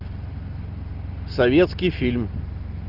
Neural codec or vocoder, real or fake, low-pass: none; real; 5.4 kHz